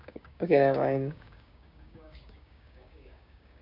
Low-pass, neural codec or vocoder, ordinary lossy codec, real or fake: 5.4 kHz; codec, 16 kHz, 6 kbps, DAC; none; fake